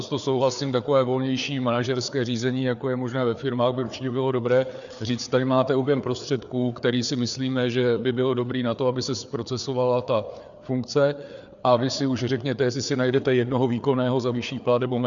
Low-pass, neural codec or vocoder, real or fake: 7.2 kHz; codec, 16 kHz, 4 kbps, FreqCodec, larger model; fake